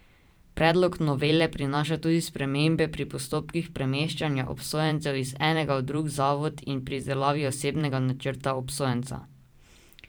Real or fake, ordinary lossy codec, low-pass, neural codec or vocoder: fake; none; none; vocoder, 44.1 kHz, 128 mel bands every 512 samples, BigVGAN v2